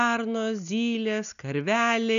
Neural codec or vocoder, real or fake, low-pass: none; real; 7.2 kHz